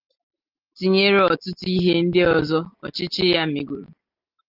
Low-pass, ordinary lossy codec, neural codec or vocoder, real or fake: 5.4 kHz; Opus, 32 kbps; none; real